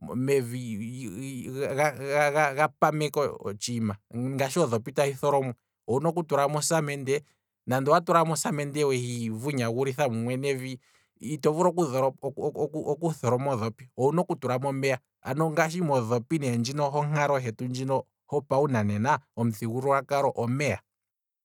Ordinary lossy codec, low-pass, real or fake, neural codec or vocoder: none; 19.8 kHz; real; none